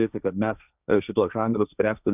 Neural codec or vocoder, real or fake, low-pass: codec, 16 kHz, 1.1 kbps, Voila-Tokenizer; fake; 3.6 kHz